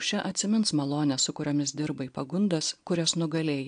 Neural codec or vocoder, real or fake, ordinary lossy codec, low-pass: none; real; AAC, 64 kbps; 9.9 kHz